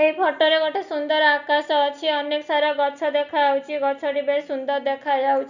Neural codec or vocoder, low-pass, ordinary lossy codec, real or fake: none; 7.2 kHz; none; real